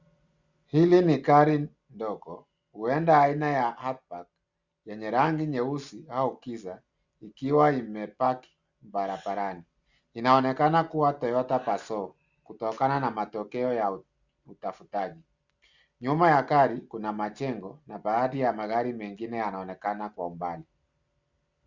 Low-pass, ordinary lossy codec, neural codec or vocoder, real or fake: 7.2 kHz; Opus, 64 kbps; none; real